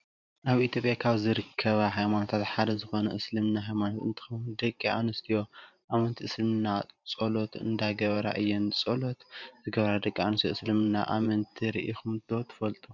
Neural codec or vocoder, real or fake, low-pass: vocoder, 44.1 kHz, 128 mel bands every 512 samples, BigVGAN v2; fake; 7.2 kHz